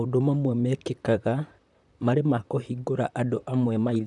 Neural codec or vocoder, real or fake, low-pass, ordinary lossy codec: vocoder, 44.1 kHz, 128 mel bands, Pupu-Vocoder; fake; 10.8 kHz; none